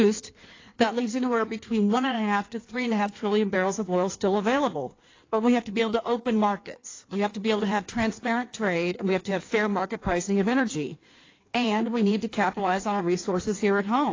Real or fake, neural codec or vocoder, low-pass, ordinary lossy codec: fake; codec, 16 kHz in and 24 kHz out, 1.1 kbps, FireRedTTS-2 codec; 7.2 kHz; AAC, 32 kbps